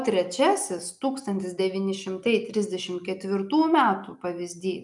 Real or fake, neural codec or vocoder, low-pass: real; none; 10.8 kHz